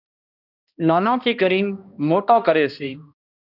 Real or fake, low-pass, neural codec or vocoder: fake; 5.4 kHz; codec, 16 kHz, 1 kbps, X-Codec, HuBERT features, trained on balanced general audio